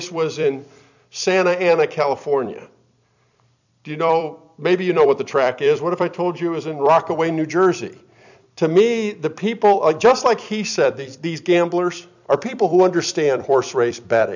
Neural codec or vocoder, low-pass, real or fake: vocoder, 44.1 kHz, 128 mel bands every 256 samples, BigVGAN v2; 7.2 kHz; fake